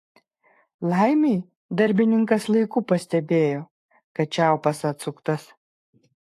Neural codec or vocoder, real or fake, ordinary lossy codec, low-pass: codec, 44.1 kHz, 7.8 kbps, Pupu-Codec; fake; AAC, 64 kbps; 14.4 kHz